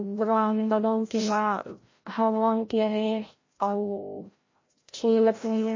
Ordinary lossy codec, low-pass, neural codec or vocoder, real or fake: MP3, 32 kbps; 7.2 kHz; codec, 16 kHz, 0.5 kbps, FreqCodec, larger model; fake